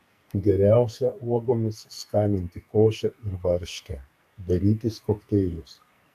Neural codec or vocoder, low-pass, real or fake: codec, 44.1 kHz, 2.6 kbps, SNAC; 14.4 kHz; fake